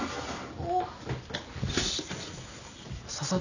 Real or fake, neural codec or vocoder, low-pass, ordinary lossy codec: real; none; 7.2 kHz; none